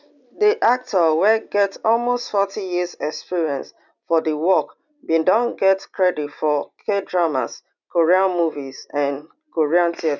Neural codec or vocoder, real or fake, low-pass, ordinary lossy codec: none; real; 7.2 kHz; none